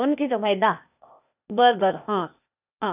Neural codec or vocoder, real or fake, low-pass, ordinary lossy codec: codec, 16 kHz, 0.8 kbps, ZipCodec; fake; 3.6 kHz; none